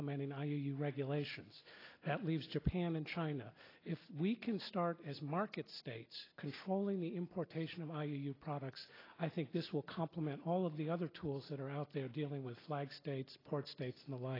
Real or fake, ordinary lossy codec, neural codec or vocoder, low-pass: real; AAC, 24 kbps; none; 5.4 kHz